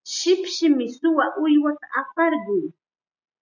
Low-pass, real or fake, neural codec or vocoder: 7.2 kHz; real; none